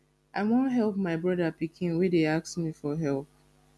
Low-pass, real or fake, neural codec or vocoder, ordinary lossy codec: none; real; none; none